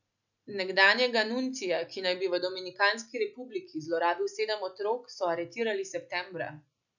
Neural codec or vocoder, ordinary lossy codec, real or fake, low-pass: none; none; real; 7.2 kHz